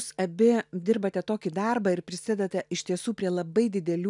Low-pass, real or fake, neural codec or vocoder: 10.8 kHz; real; none